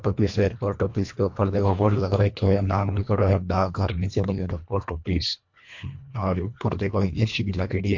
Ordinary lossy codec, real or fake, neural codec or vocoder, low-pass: MP3, 48 kbps; fake; codec, 24 kHz, 1.5 kbps, HILCodec; 7.2 kHz